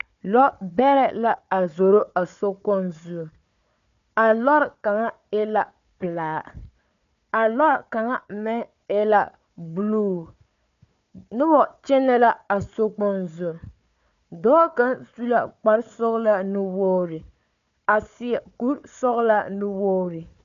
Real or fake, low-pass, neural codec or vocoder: fake; 7.2 kHz; codec, 16 kHz, 4 kbps, FunCodec, trained on Chinese and English, 50 frames a second